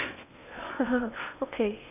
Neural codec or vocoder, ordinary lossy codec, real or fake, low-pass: codec, 16 kHz in and 24 kHz out, 0.8 kbps, FocalCodec, streaming, 65536 codes; none; fake; 3.6 kHz